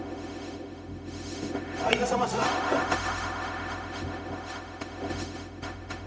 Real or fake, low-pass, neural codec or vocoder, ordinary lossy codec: fake; none; codec, 16 kHz, 0.4 kbps, LongCat-Audio-Codec; none